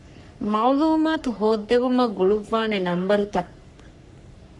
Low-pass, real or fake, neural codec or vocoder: 10.8 kHz; fake; codec, 44.1 kHz, 3.4 kbps, Pupu-Codec